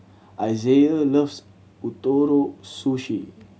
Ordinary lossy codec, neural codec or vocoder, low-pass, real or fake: none; none; none; real